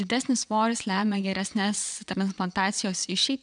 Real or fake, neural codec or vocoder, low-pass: fake; vocoder, 22.05 kHz, 80 mel bands, WaveNeXt; 9.9 kHz